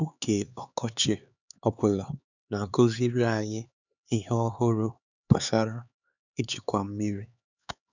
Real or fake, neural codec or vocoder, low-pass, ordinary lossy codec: fake; codec, 16 kHz, 4 kbps, X-Codec, HuBERT features, trained on LibriSpeech; 7.2 kHz; none